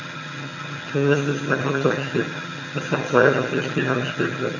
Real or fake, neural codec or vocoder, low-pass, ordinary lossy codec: fake; vocoder, 22.05 kHz, 80 mel bands, HiFi-GAN; 7.2 kHz; none